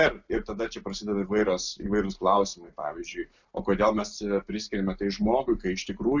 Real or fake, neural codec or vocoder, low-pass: real; none; 7.2 kHz